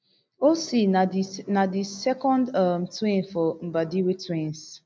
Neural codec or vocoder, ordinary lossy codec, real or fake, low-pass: none; none; real; none